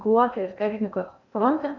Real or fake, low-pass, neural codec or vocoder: fake; 7.2 kHz; codec, 16 kHz in and 24 kHz out, 0.8 kbps, FocalCodec, streaming, 65536 codes